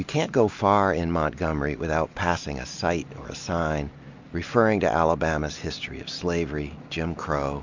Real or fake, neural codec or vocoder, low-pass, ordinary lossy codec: real; none; 7.2 kHz; MP3, 64 kbps